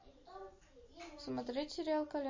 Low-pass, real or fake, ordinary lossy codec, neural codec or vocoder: 7.2 kHz; real; MP3, 32 kbps; none